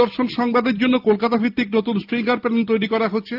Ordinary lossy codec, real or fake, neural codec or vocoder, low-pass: Opus, 24 kbps; real; none; 5.4 kHz